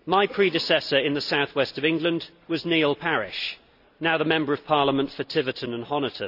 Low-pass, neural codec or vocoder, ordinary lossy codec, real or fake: 5.4 kHz; none; none; real